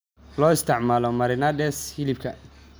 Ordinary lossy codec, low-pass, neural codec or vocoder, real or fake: none; none; none; real